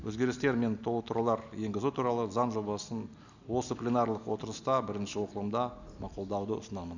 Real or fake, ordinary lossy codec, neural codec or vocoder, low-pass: real; none; none; 7.2 kHz